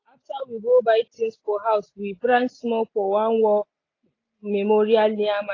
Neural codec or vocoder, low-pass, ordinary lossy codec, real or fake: none; 7.2 kHz; AAC, 32 kbps; real